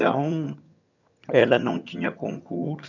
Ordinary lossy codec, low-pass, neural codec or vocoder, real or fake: none; 7.2 kHz; vocoder, 22.05 kHz, 80 mel bands, HiFi-GAN; fake